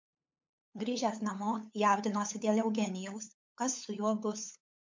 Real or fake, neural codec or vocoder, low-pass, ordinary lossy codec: fake; codec, 16 kHz, 8 kbps, FunCodec, trained on LibriTTS, 25 frames a second; 7.2 kHz; MP3, 48 kbps